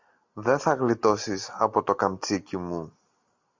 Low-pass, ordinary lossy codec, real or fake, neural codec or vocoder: 7.2 kHz; MP3, 64 kbps; real; none